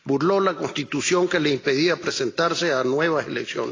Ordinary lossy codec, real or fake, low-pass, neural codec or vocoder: AAC, 32 kbps; real; 7.2 kHz; none